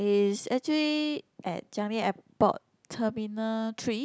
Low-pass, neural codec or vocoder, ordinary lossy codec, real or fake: none; none; none; real